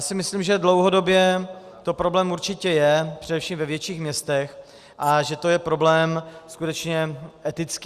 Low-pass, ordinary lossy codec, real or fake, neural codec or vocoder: 14.4 kHz; Opus, 64 kbps; real; none